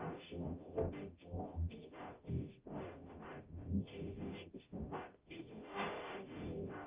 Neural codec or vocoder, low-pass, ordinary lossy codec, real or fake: codec, 44.1 kHz, 0.9 kbps, DAC; 3.6 kHz; Opus, 24 kbps; fake